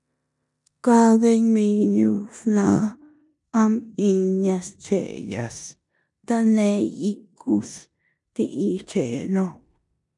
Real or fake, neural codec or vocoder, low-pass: fake; codec, 16 kHz in and 24 kHz out, 0.9 kbps, LongCat-Audio-Codec, four codebook decoder; 10.8 kHz